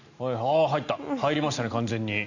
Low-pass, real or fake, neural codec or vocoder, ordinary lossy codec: 7.2 kHz; real; none; none